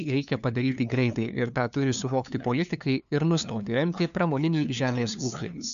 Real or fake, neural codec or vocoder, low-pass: fake; codec, 16 kHz, 2 kbps, FunCodec, trained on LibriTTS, 25 frames a second; 7.2 kHz